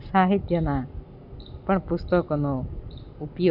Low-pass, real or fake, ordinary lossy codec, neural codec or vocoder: 5.4 kHz; real; none; none